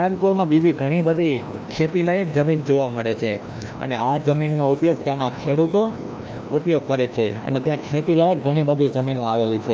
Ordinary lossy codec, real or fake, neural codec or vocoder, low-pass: none; fake; codec, 16 kHz, 1 kbps, FreqCodec, larger model; none